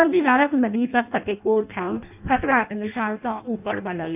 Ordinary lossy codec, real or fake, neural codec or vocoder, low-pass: none; fake; codec, 16 kHz in and 24 kHz out, 0.6 kbps, FireRedTTS-2 codec; 3.6 kHz